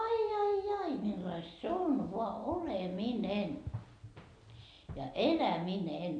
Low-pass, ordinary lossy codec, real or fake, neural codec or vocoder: 9.9 kHz; none; real; none